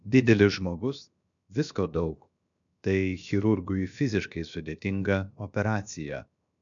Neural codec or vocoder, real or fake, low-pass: codec, 16 kHz, 0.7 kbps, FocalCodec; fake; 7.2 kHz